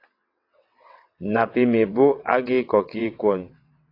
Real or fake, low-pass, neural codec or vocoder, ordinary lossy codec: fake; 5.4 kHz; vocoder, 22.05 kHz, 80 mel bands, WaveNeXt; AAC, 32 kbps